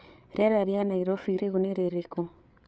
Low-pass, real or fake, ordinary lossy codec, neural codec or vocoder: none; fake; none; codec, 16 kHz, 8 kbps, FreqCodec, larger model